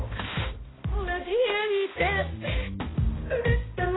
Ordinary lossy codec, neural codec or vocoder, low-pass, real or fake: AAC, 16 kbps; codec, 16 kHz, 1 kbps, X-Codec, HuBERT features, trained on balanced general audio; 7.2 kHz; fake